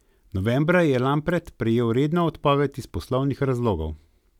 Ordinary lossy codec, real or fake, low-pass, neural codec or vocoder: none; real; 19.8 kHz; none